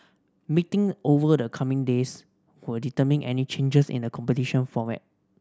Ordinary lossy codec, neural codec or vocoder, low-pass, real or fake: none; none; none; real